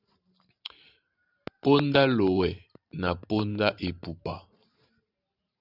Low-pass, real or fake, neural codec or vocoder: 5.4 kHz; fake; vocoder, 44.1 kHz, 128 mel bands every 512 samples, BigVGAN v2